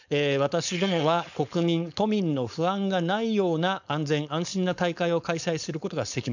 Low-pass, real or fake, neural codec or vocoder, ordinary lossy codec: 7.2 kHz; fake; codec, 16 kHz, 4.8 kbps, FACodec; none